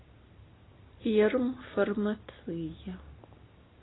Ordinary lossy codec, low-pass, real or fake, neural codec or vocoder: AAC, 16 kbps; 7.2 kHz; real; none